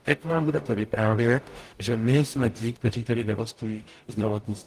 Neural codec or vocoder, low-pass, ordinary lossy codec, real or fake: codec, 44.1 kHz, 0.9 kbps, DAC; 14.4 kHz; Opus, 24 kbps; fake